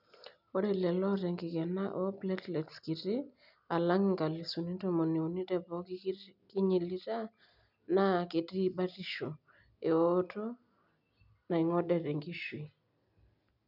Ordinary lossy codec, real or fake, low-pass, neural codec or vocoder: none; real; 5.4 kHz; none